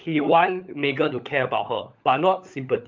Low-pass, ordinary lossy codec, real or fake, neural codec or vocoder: 7.2 kHz; Opus, 24 kbps; fake; codec, 16 kHz, 16 kbps, FunCodec, trained on LibriTTS, 50 frames a second